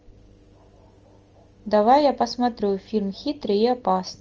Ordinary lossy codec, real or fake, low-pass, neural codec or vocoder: Opus, 24 kbps; real; 7.2 kHz; none